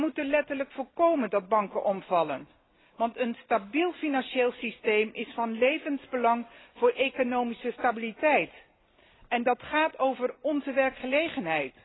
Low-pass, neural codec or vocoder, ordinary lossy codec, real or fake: 7.2 kHz; none; AAC, 16 kbps; real